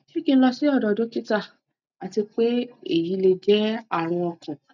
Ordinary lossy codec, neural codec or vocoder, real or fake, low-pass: none; none; real; 7.2 kHz